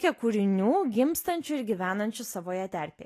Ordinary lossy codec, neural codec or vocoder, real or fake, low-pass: AAC, 48 kbps; none; real; 14.4 kHz